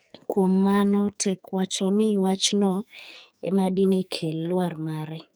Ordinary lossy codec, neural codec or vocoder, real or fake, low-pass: none; codec, 44.1 kHz, 2.6 kbps, SNAC; fake; none